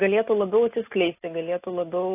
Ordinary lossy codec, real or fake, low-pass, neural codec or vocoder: AAC, 24 kbps; real; 3.6 kHz; none